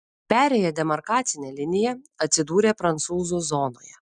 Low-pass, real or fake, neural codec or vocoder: 10.8 kHz; real; none